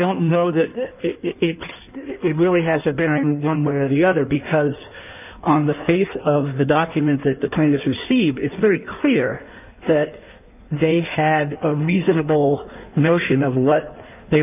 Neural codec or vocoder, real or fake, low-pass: codec, 16 kHz in and 24 kHz out, 1.1 kbps, FireRedTTS-2 codec; fake; 3.6 kHz